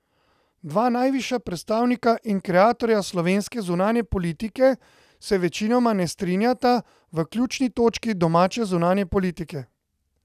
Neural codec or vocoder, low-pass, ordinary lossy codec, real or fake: none; 14.4 kHz; none; real